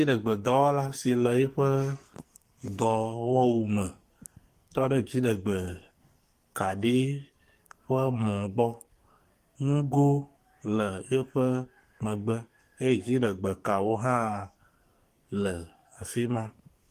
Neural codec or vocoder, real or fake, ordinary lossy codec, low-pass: codec, 44.1 kHz, 2.6 kbps, SNAC; fake; Opus, 24 kbps; 14.4 kHz